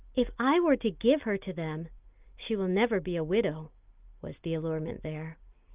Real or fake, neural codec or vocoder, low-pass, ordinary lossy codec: real; none; 3.6 kHz; Opus, 32 kbps